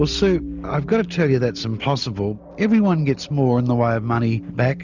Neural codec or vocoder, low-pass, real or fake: none; 7.2 kHz; real